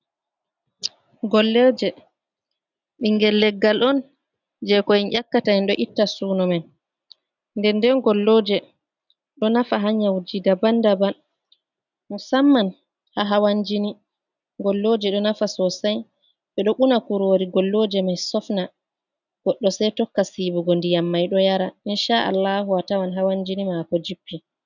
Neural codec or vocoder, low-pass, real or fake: none; 7.2 kHz; real